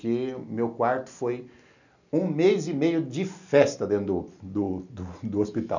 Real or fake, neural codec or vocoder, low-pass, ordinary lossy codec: real; none; 7.2 kHz; none